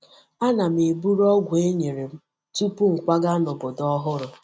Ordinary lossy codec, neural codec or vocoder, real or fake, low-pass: none; none; real; none